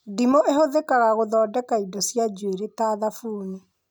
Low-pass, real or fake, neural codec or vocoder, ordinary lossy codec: none; real; none; none